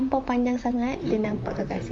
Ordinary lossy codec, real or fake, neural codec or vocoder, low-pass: MP3, 48 kbps; fake; vocoder, 44.1 kHz, 128 mel bands, Pupu-Vocoder; 9.9 kHz